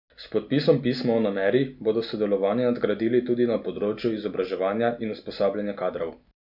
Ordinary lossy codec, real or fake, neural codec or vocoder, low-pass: none; real; none; 5.4 kHz